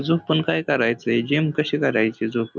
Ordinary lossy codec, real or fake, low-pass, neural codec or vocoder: none; real; none; none